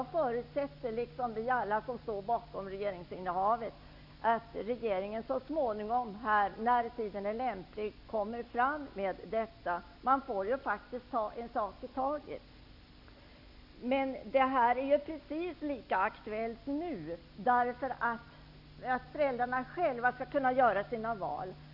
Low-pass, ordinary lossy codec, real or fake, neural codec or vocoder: 5.4 kHz; none; real; none